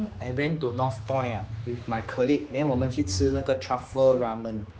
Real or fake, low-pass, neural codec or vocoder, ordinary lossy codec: fake; none; codec, 16 kHz, 2 kbps, X-Codec, HuBERT features, trained on general audio; none